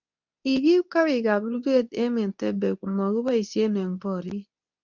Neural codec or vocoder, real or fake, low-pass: codec, 24 kHz, 0.9 kbps, WavTokenizer, medium speech release version 2; fake; 7.2 kHz